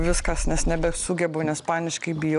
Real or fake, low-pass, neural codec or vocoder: real; 10.8 kHz; none